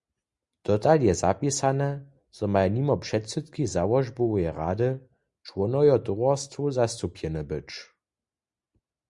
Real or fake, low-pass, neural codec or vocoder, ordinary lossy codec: real; 10.8 kHz; none; Opus, 64 kbps